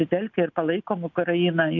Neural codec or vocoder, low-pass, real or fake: none; 7.2 kHz; real